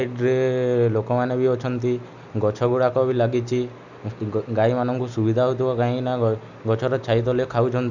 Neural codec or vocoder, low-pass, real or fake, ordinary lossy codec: none; 7.2 kHz; real; none